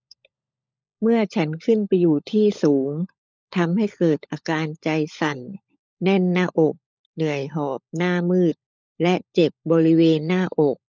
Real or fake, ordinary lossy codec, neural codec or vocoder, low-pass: fake; none; codec, 16 kHz, 16 kbps, FunCodec, trained on LibriTTS, 50 frames a second; none